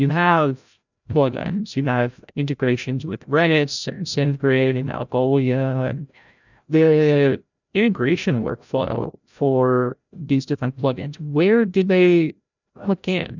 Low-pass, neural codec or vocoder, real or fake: 7.2 kHz; codec, 16 kHz, 0.5 kbps, FreqCodec, larger model; fake